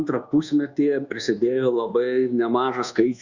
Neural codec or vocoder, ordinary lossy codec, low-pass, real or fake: codec, 24 kHz, 1.2 kbps, DualCodec; Opus, 64 kbps; 7.2 kHz; fake